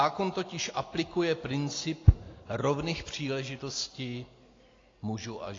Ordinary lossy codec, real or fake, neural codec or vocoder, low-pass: AAC, 32 kbps; real; none; 7.2 kHz